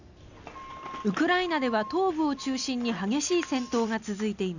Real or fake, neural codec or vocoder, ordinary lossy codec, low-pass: real; none; none; 7.2 kHz